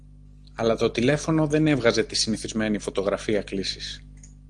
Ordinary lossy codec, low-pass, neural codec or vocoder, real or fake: Opus, 24 kbps; 9.9 kHz; none; real